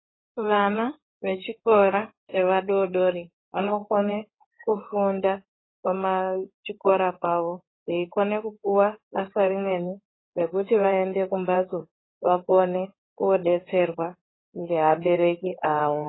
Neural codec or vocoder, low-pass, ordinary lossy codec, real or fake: codec, 16 kHz in and 24 kHz out, 2.2 kbps, FireRedTTS-2 codec; 7.2 kHz; AAC, 16 kbps; fake